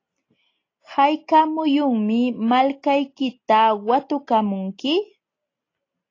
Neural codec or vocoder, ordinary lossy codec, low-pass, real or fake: none; AAC, 32 kbps; 7.2 kHz; real